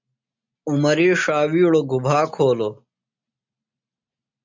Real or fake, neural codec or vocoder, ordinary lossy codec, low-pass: real; none; MP3, 64 kbps; 7.2 kHz